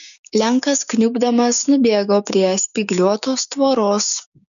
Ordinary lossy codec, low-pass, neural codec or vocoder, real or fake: MP3, 96 kbps; 7.2 kHz; codec, 16 kHz, 6 kbps, DAC; fake